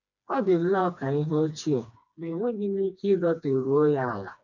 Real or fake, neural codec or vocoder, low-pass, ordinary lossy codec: fake; codec, 16 kHz, 2 kbps, FreqCodec, smaller model; 7.2 kHz; none